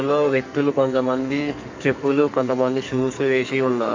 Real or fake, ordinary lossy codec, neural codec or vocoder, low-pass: fake; MP3, 64 kbps; codec, 44.1 kHz, 2.6 kbps, SNAC; 7.2 kHz